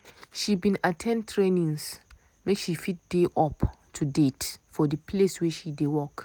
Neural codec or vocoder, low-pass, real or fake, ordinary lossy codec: none; none; real; none